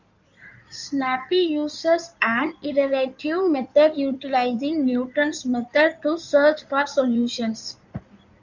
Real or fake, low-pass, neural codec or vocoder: fake; 7.2 kHz; codec, 16 kHz in and 24 kHz out, 2.2 kbps, FireRedTTS-2 codec